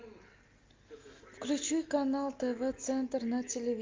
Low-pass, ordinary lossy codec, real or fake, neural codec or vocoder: 7.2 kHz; Opus, 32 kbps; real; none